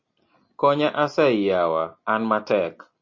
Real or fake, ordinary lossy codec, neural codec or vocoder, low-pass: real; MP3, 32 kbps; none; 7.2 kHz